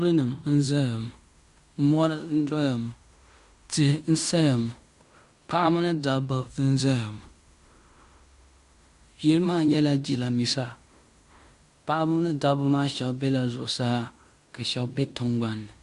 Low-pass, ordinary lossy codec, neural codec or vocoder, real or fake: 10.8 kHz; Opus, 64 kbps; codec, 16 kHz in and 24 kHz out, 0.9 kbps, LongCat-Audio-Codec, fine tuned four codebook decoder; fake